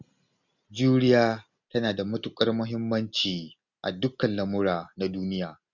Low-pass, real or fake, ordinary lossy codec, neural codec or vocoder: 7.2 kHz; real; none; none